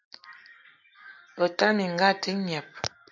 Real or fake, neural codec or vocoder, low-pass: real; none; 7.2 kHz